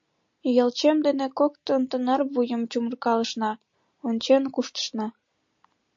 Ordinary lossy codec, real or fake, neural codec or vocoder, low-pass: MP3, 48 kbps; real; none; 7.2 kHz